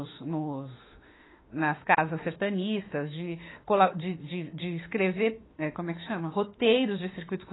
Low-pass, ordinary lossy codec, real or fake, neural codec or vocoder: 7.2 kHz; AAC, 16 kbps; fake; autoencoder, 48 kHz, 32 numbers a frame, DAC-VAE, trained on Japanese speech